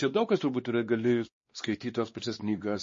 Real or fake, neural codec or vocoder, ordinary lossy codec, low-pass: fake; codec, 16 kHz, 2 kbps, X-Codec, WavLM features, trained on Multilingual LibriSpeech; MP3, 32 kbps; 7.2 kHz